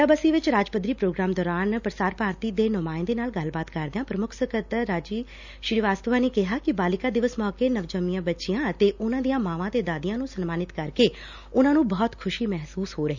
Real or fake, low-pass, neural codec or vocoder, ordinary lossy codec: real; 7.2 kHz; none; none